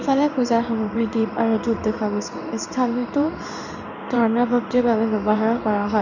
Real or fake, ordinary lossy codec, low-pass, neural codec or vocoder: fake; none; 7.2 kHz; codec, 16 kHz in and 24 kHz out, 1 kbps, XY-Tokenizer